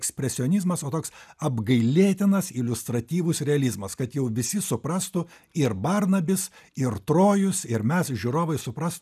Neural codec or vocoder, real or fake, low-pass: none; real; 14.4 kHz